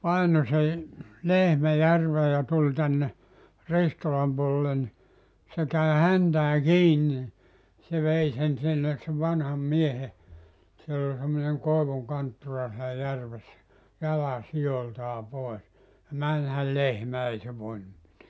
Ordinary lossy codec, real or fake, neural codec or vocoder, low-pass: none; real; none; none